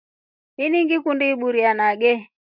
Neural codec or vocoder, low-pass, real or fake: none; 5.4 kHz; real